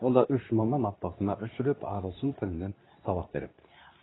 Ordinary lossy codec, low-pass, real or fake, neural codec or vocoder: AAC, 16 kbps; 7.2 kHz; fake; codec, 24 kHz, 0.9 kbps, WavTokenizer, medium speech release version 2